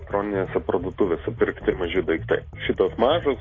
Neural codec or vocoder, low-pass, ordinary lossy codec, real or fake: none; 7.2 kHz; AAC, 32 kbps; real